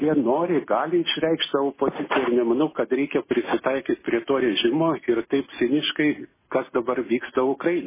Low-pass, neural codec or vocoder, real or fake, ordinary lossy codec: 3.6 kHz; none; real; MP3, 16 kbps